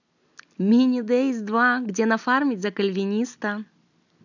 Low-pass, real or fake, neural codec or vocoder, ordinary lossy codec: 7.2 kHz; real; none; none